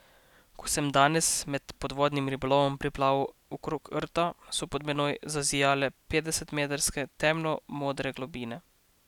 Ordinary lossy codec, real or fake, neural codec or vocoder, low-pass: none; real; none; 19.8 kHz